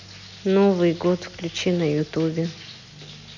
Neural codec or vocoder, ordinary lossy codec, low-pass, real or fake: none; none; 7.2 kHz; real